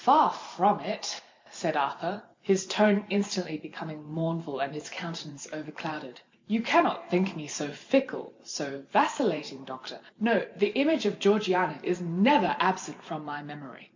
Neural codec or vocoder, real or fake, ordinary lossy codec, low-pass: vocoder, 44.1 kHz, 128 mel bands every 512 samples, BigVGAN v2; fake; MP3, 48 kbps; 7.2 kHz